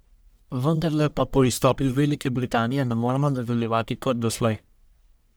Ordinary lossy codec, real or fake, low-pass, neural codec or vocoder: none; fake; none; codec, 44.1 kHz, 1.7 kbps, Pupu-Codec